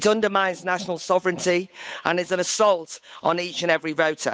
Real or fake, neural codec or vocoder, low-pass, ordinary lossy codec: fake; codec, 16 kHz, 8 kbps, FunCodec, trained on Chinese and English, 25 frames a second; none; none